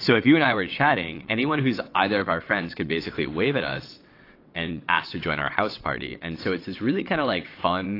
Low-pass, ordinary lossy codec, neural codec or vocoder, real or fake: 5.4 kHz; AAC, 32 kbps; vocoder, 22.05 kHz, 80 mel bands, WaveNeXt; fake